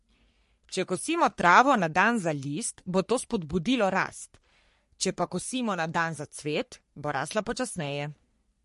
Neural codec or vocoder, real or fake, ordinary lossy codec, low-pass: codec, 44.1 kHz, 3.4 kbps, Pupu-Codec; fake; MP3, 48 kbps; 14.4 kHz